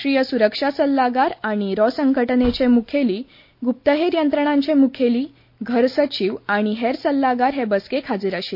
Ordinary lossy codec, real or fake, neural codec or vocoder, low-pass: MP3, 32 kbps; real; none; 5.4 kHz